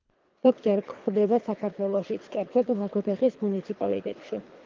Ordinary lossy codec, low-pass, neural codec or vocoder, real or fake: Opus, 24 kbps; 7.2 kHz; codec, 24 kHz, 3 kbps, HILCodec; fake